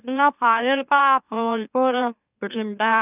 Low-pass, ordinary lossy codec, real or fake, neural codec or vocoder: 3.6 kHz; none; fake; autoencoder, 44.1 kHz, a latent of 192 numbers a frame, MeloTTS